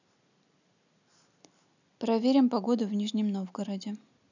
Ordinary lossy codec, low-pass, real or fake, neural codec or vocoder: none; 7.2 kHz; real; none